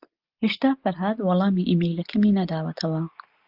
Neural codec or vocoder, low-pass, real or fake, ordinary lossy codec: none; 5.4 kHz; real; Opus, 32 kbps